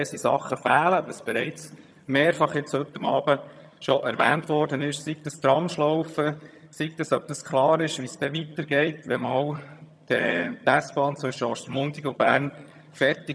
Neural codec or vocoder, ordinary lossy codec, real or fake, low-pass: vocoder, 22.05 kHz, 80 mel bands, HiFi-GAN; none; fake; none